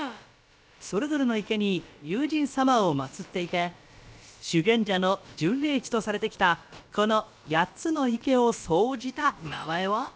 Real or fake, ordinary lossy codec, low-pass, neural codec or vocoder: fake; none; none; codec, 16 kHz, about 1 kbps, DyCAST, with the encoder's durations